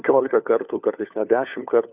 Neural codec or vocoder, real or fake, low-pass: codec, 16 kHz, 4 kbps, FunCodec, trained on LibriTTS, 50 frames a second; fake; 3.6 kHz